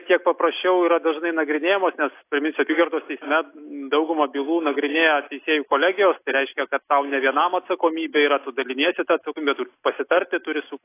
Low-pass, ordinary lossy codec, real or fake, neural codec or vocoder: 3.6 kHz; AAC, 24 kbps; real; none